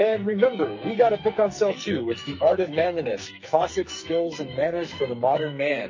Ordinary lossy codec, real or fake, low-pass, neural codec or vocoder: MP3, 32 kbps; fake; 7.2 kHz; codec, 44.1 kHz, 2.6 kbps, SNAC